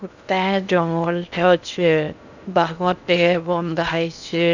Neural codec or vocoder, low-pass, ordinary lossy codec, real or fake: codec, 16 kHz in and 24 kHz out, 0.6 kbps, FocalCodec, streaming, 2048 codes; 7.2 kHz; none; fake